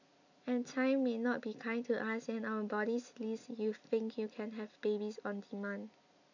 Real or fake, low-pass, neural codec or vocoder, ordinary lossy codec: real; 7.2 kHz; none; none